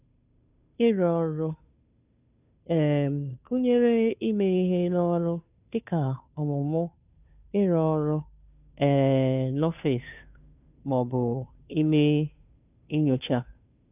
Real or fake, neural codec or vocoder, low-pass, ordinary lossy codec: fake; codec, 16 kHz, 2 kbps, FunCodec, trained on Chinese and English, 25 frames a second; 3.6 kHz; none